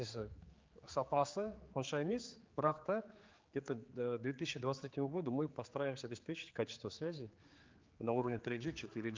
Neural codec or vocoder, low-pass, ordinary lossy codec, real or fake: codec, 16 kHz, 4 kbps, X-Codec, HuBERT features, trained on general audio; 7.2 kHz; Opus, 32 kbps; fake